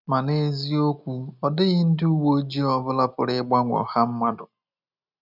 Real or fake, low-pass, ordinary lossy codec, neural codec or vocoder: real; 5.4 kHz; none; none